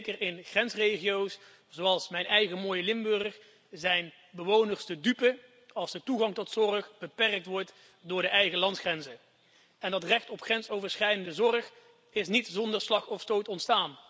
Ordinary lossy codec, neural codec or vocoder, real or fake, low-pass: none; none; real; none